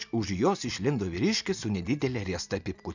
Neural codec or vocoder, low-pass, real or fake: none; 7.2 kHz; real